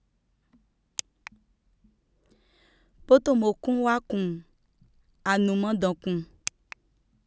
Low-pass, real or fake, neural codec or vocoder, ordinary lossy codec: none; real; none; none